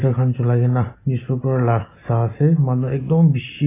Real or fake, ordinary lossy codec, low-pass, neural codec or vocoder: real; AAC, 16 kbps; 3.6 kHz; none